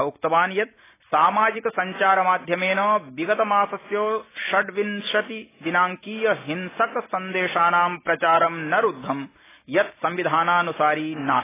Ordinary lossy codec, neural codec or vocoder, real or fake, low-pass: AAC, 16 kbps; none; real; 3.6 kHz